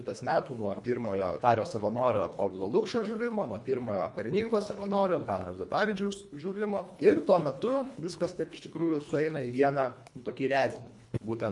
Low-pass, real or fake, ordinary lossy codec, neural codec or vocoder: 10.8 kHz; fake; MP3, 64 kbps; codec, 24 kHz, 1.5 kbps, HILCodec